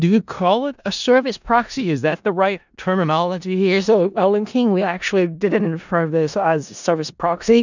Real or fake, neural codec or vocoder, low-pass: fake; codec, 16 kHz in and 24 kHz out, 0.4 kbps, LongCat-Audio-Codec, four codebook decoder; 7.2 kHz